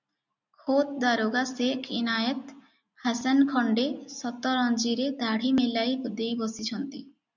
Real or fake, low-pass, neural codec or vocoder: real; 7.2 kHz; none